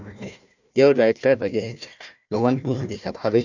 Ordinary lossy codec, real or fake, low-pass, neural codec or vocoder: none; fake; 7.2 kHz; codec, 16 kHz, 1 kbps, FunCodec, trained on Chinese and English, 50 frames a second